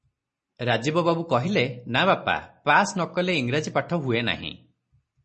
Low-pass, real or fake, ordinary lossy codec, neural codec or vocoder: 10.8 kHz; real; MP3, 32 kbps; none